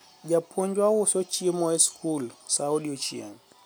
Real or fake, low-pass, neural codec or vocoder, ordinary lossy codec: real; none; none; none